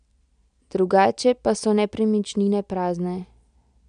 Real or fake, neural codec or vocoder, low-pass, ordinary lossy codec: real; none; 9.9 kHz; none